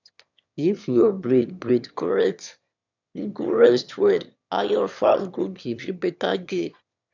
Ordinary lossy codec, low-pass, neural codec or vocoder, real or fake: none; 7.2 kHz; autoencoder, 22.05 kHz, a latent of 192 numbers a frame, VITS, trained on one speaker; fake